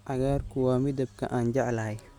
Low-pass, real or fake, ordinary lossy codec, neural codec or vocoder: 19.8 kHz; real; Opus, 64 kbps; none